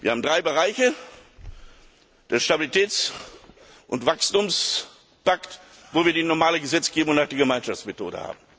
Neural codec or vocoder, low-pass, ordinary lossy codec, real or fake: none; none; none; real